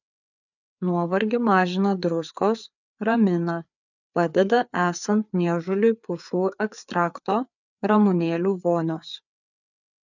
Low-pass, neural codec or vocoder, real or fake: 7.2 kHz; codec, 16 kHz, 4 kbps, FreqCodec, larger model; fake